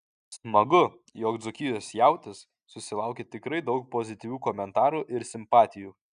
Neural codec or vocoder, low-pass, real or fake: none; 9.9 kHz; real